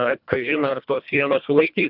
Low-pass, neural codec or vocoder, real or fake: 5.4 kHz; codec, 24 kHz, 1.5 kbps, HILCodec; fake